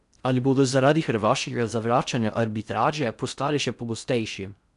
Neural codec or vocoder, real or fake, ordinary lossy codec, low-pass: codec, 16 kHz in and 24 kHz out, 0.6 kbps, FocalCodec, streaming, 4096 codes; fake; none; 10.8 kHz